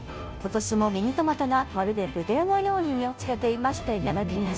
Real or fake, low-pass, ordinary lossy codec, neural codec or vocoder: fake; none; none; codec, 16 kHz, 0.5 kbps, FunCodec, trained on Chinese and English, 25 frames a second